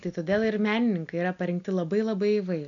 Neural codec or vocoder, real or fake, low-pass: none; real; 7.2 kHz